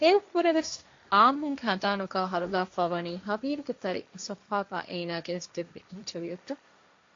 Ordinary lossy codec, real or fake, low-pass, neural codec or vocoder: AAC, 48 kbps; fake; 7.2 kHz; codec, 16 kHz, 1.1 kbps, Voila-Tokenizer